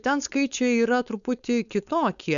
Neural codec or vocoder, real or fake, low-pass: codec, 16 kHz, 4.8 kbps, FACodec; fake; 7.2 kHz